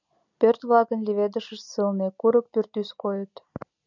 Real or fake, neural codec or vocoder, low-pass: real; none; 7.2 kHz